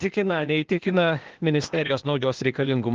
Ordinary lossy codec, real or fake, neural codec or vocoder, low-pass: Opus, 16 kbps; fake; codec, 16 kHz, 0.8 kbps, ZipCodec; 7.2 kHz